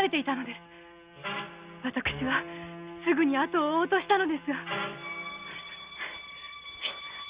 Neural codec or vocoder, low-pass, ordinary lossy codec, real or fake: none; 3.6 kHz; Opus, 64 kbps; real